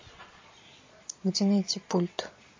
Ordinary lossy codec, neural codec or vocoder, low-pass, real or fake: MP3, 32 kbps; vocoder, 22.05 kHz, 80 mel bands, Vocos; 7.2 kHz; fake